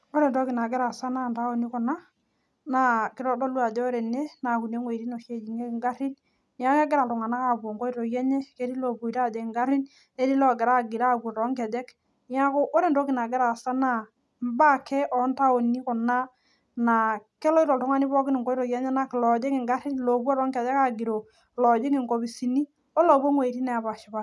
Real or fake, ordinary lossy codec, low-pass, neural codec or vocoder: real; none; none; none